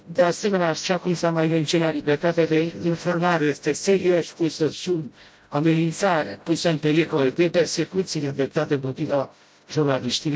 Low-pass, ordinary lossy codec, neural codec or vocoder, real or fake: none; none; codec, 16 kHz, 0.5 kbps, FreqCodec, smaller model; fake